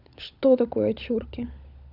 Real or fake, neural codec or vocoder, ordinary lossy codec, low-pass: fake; codec, 16 kHz, 16 kbps, FunCodec, trained on LibriTTS, 50 frames a second; none; 5.4 kHz